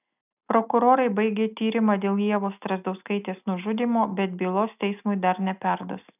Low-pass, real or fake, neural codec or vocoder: 3.6 kHz; real; none